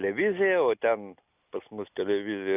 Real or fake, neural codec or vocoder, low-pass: real; none; 3.6 kHz